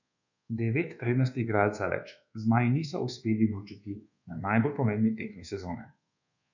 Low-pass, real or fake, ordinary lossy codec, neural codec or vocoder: 7.2 kHz; fake; none; codec, 24 kHz, 1.2 kbps, DualCodec